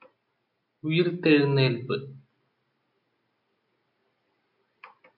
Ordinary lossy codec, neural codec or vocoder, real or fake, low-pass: MP3, 48 kbps; none; real; 5.4 kHz